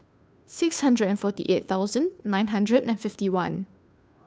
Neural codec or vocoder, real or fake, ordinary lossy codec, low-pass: codec, 16 kHz, 2 kbps, FunCodec, trained on Chinese and English, 25 frames a second; fake; none; none